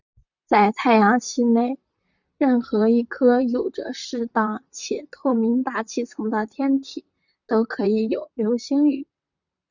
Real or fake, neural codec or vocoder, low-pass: fake; vocoder, 44.1 kHz, 128 mel bands, Pupu-Vocoder; 7.2 kHz